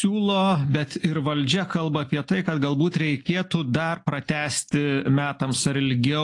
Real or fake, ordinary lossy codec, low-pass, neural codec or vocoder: real; AAC, 48 kbps; 10.8 kHz; none